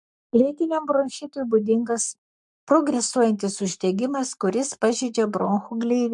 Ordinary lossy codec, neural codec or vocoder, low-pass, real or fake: MP3, 64 kbps; codec, 44.1 kHz, 7.8 kbps, Pupu-Codec; 10.8 kHz; fake